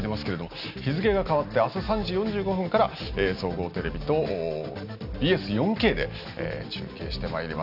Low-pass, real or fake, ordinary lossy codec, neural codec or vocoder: 5.4 kHz; real; none; none